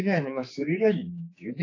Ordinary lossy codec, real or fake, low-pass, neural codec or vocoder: AAC, 32 kbps; fake; 7.2 kHz; codec, 16 kHz, 4 kbps, X-Codec, HuBERT features, trained on general audio